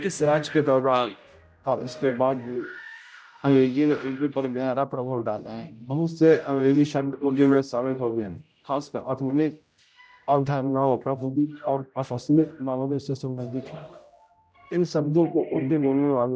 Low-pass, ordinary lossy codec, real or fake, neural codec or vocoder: none; none; fake; codec, 16 kHz, 0.5 kbps, X-Codec, HuBERT features, trained on general audio